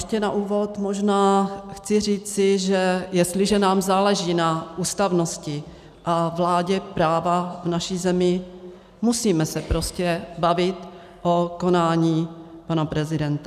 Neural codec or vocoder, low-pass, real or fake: none; 14.4 kHz; real